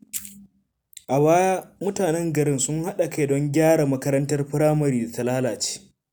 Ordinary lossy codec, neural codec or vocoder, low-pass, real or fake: none; none; none; real